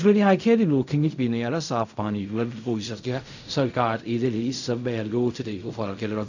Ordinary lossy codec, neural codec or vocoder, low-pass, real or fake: none; codec, 16 kHz in and 24 kHz out, 0.4 kbps, LongCat-Audio-Codec, fine tuned four codebook decoder; 7.2 kHz; fake